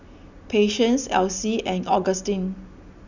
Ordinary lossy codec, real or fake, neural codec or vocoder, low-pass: none; real; none; 7.2 kHz